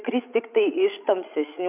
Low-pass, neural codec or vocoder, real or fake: 3.6 kHz; codec, 16 kHz, 16 kbps, FreqCodec, larger model; fake